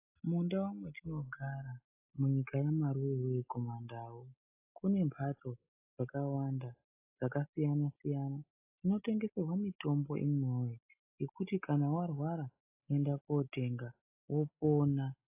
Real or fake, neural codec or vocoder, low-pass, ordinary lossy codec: real; none; 3.6 kHz; AAC, 32 kbps